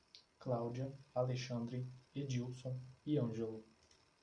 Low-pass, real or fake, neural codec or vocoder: 9.9 kHz; real; none